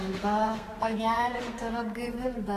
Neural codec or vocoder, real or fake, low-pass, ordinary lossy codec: codec, 32 kHz, 1.9 kbps, SNAC; fake; 14.4 kHz; AAC, 48 kbps